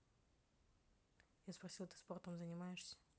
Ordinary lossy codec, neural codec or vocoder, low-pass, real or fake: none; none; none; real